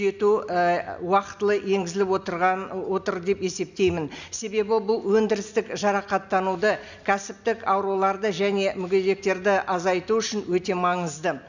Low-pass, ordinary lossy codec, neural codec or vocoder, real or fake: 7.2 kHz; none; none; real